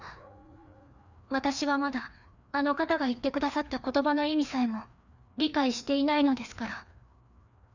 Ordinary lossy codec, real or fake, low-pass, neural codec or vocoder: none; fake; 7.2 kHz; codec, 16 kHz, 2 kbps, FreqCodec, larger model